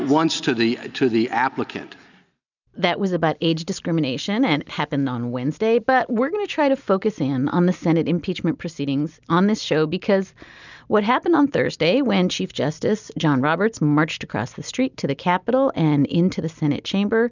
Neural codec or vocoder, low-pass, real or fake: none; 7.2 kHz; real